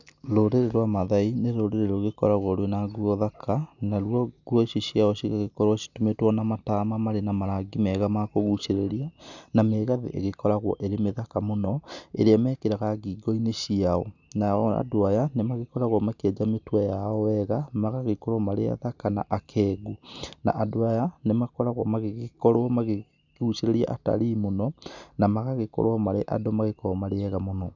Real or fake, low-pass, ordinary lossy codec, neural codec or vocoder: real; 7.2 kHz; none; none